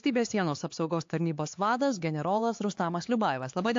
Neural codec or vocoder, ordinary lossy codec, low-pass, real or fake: codec, 16 kHz, 4 kbps, X-Codec, HuBERT features, trained on LibriSpeech; AAC, 48 kbps; 7.2 kHz; fake